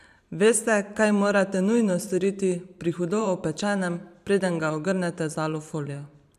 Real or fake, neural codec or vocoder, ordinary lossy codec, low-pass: fake; vocoder, 44.1 kHz, 128 mel bands every 512 samples, BigVGAN v2; none; 14.4 kHz